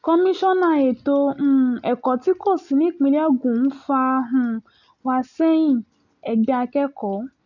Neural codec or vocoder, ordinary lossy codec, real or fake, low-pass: none; none; real; 7.2 kHz